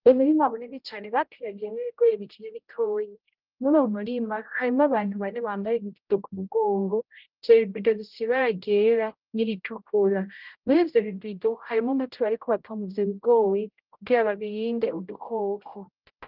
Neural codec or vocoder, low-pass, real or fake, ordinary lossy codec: codec, 16 kHz, 0.5 kbps, X-Codec, HuBERT features, trained on general audio; 5.4 kHz; fake; Opus, 24 kbps